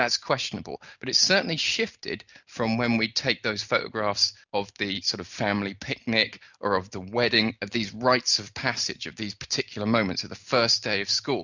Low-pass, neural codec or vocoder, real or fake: 7.2 kHz; none; real